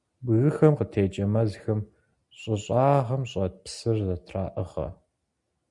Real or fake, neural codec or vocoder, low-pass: real; none; 10.8 kHz